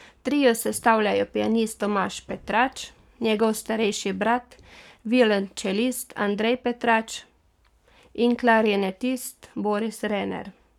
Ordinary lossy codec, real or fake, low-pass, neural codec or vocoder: none; fake; 19.8 kHz; codec, 44.1 kHz, 7.8 kbps, Pupu-Codec